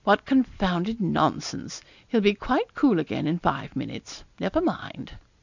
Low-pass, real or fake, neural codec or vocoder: 7.2 kHz; real; none